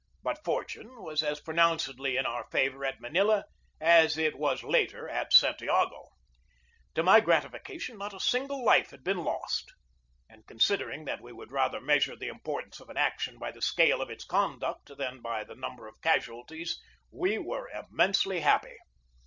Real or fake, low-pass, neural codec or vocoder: real; 7.2 kHz; none